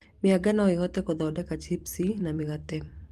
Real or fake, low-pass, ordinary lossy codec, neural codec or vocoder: fake; 14.4 kHz; Opus, 24 kbps; vocoder, 44.1 kHz, 128 mel bands every 256 samples, BigVGAN v2